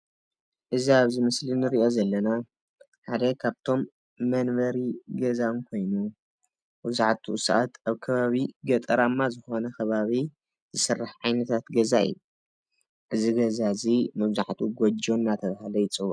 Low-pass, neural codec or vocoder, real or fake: 9.9 kHz; none; real